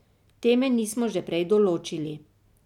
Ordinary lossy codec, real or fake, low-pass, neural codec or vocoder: none; real; 19.8 kHz; none